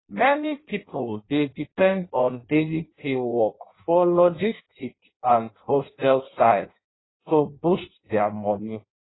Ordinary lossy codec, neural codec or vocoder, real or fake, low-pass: AAC, 16 kbps; codec, 16 kHz in and 24 kHz out, 0.6 kbps, FireRedTTS-2 codec; fake; 7.2 kHz